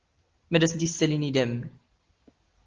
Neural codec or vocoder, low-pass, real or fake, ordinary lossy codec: none; 7.2 kHz; real; Opus, 16 kbps